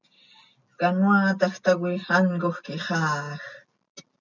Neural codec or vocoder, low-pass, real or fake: none; 7.2 kHz; real